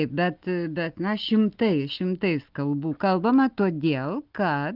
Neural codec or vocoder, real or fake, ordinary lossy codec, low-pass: none; real; Opus, 32 kbps; 5.4 kHz